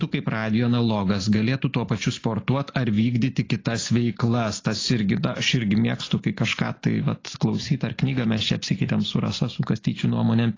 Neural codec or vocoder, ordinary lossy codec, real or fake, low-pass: none; AAC, 32 kbps; real; 7.2 kHz